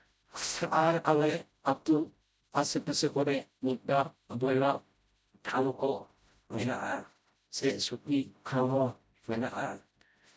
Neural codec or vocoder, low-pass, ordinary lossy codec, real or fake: codec, 16 kHz, 0.5 kbps, FreqCodec, smaller model; none; none; fake